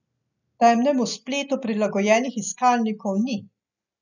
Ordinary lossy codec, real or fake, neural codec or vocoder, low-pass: none; real; none; 7.2 kHz